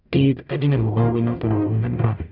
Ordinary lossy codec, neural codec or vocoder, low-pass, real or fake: none; codec, 44.1 kHz, 0.9 kbps, DAC; 5.4 kHz; fake